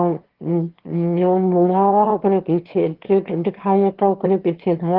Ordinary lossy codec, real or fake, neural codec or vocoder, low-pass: Opus, 16 kbps; fake; autoencoder, 22.05 kHz, a latent of 192 numbers a frame, VITS, trained on one speaker; 5.4 kHz